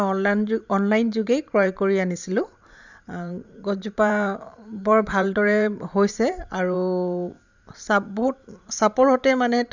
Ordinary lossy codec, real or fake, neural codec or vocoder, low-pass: none; fake; vocoder, 44.1 kHz, 128 mel bands every 512 samples, BigVGAN v2; 7.2 kHz